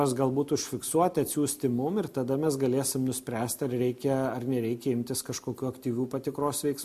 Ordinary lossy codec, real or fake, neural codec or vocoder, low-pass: MP3, 64 kbps; real; none; 14.4 kHz